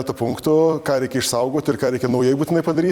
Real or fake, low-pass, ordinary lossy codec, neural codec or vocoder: fake; 19.8 kHz; Opus, 64 kbps; vocoder, 44.1 kHz, 128 mel bands every 256 samples, BigVGAN v2